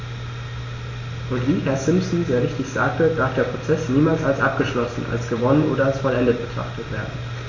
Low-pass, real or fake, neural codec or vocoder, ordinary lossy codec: 7.2 kHz; real; none; AAC, 32 kbps